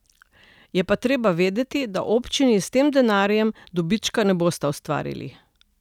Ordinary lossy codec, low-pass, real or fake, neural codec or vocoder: none; 19.8 kHz; real; none